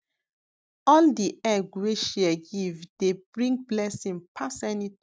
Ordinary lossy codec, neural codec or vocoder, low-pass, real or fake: none; none; none; real